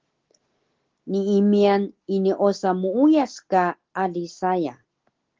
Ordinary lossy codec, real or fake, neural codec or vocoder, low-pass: Opus, 16 kbps; real; none; 7.2 kHz